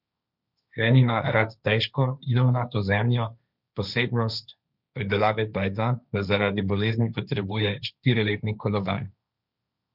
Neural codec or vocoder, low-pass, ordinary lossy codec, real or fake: codec, 16 kHz, 1.1 kbps, Voila-Tokenizer; 5.4 kHz; none; fake